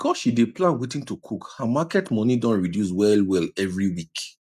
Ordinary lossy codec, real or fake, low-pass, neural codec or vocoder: none; real; 14.4 kHz; none